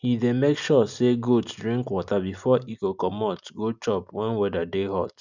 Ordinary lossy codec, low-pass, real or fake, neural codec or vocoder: none; 7.2 kHz; real; none